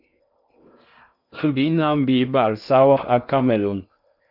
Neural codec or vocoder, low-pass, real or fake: codec, 16 kHz in and 24 kHz out, 0.6 kbps, FocalCodec, streaming, 2048 codes; 5.4 kHz; fake